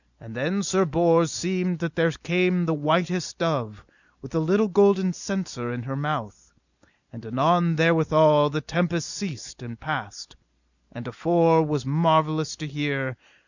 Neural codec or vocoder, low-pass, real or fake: none; 7.2 kHz; real